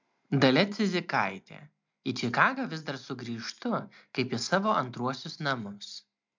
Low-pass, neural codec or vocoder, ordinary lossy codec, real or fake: 7.2 kHz; none; MP3, 64 kbps; real